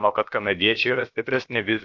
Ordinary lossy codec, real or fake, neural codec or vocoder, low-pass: AAC, 48 kbps; fake; codec, 16 kHz, about 1 kbps, DyCAST, with the encoder's durations; 7.2 kHz